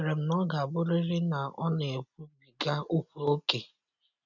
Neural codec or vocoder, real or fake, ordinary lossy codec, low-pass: none; real; none; 7.2 kHz